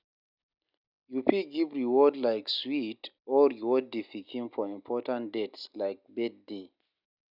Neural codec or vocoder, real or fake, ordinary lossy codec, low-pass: none; real; none; 5.4 kHz